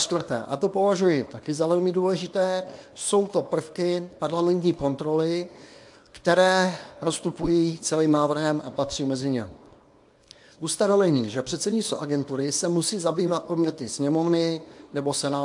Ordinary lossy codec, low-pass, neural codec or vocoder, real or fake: AAC, 64 kbps; 10.8 kHz; codec, 24 kHz, 0.9 kbps, WavTokenizer, small release; fake